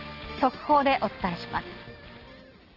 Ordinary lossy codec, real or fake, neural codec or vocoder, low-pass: Opus, 16 kbps; real; none; 5.4 kHz